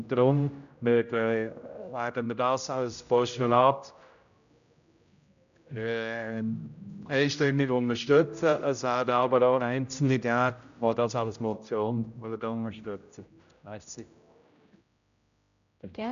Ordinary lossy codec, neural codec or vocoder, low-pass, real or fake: none; codec, 16 kHz, 0.5 kbps, X-Codec, HuBERT features, trained on general audio; 7.2 kHz; fake